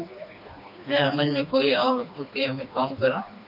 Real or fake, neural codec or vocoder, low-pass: fake; codec, 16 kHz, 2 kbps, FreqCodec, smaller model; 5.4 kHz